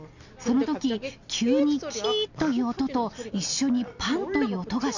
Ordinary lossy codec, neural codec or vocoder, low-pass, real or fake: none; none; 7.2 kHz; real